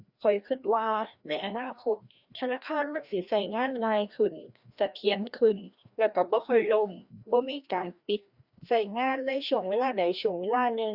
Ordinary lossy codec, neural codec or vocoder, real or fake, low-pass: Opus, 64 kbps; codec, 16 kHz, 1 kbps, FreqCodec, larger model; fake; 5.4 kHz